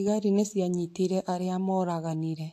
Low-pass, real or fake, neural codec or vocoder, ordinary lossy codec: 14.4 kHz; real; none; AAC, 64 kbps